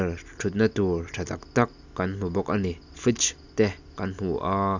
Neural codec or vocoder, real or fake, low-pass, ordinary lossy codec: none; real; 7.2 kHz; none